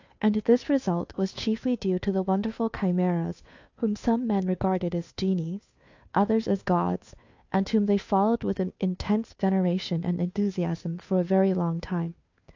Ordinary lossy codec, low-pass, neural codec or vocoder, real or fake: AAC, 48 kbps; 7.2 kHz; codec, 16 kHz, 2 kbps, FunCodec, trained on Chinese and English, 25 frames a second; fake